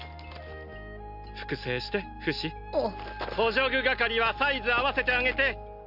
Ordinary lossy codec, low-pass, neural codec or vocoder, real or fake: none; 5.4 kHz; none; real